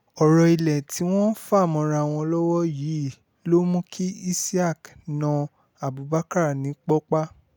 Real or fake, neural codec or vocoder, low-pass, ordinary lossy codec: real; none; none; none